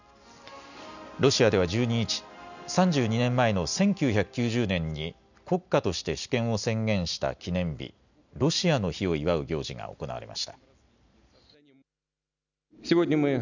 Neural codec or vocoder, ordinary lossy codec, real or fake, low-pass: none; none; real; 7.2 kHz